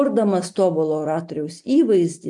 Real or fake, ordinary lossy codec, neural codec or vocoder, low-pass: real; MP3, 64 kbps; none; 10.8 kHz